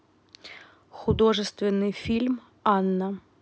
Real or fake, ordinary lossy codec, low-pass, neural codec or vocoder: real; none; none; none